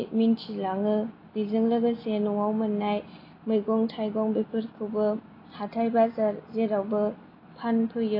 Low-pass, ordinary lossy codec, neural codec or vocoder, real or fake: 5.4 kHz; AAC, 24 kbps; none; real